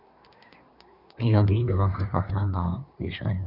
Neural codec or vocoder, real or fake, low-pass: codec, 24 kHz, 1 kbps, SNAC; fake; 5.4 kHz